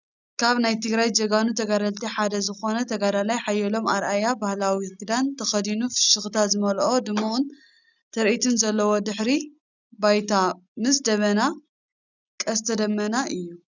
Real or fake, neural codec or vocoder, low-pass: real; none; 7.2 kHz